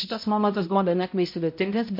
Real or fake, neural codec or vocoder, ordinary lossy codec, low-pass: fake; codec, 16 kHz, 0.5 kbps, X-Codec, HuBERT features, trained on balanced general audio; MP3, 32 kbps; 5.4 kHz